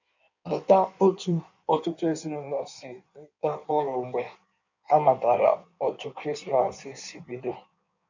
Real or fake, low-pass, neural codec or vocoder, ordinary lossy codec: fake; 7.2 kHz; codec, 16 kHz in and 24 kHz out, 1.1 kbps, FireRedTTS-2 codec; none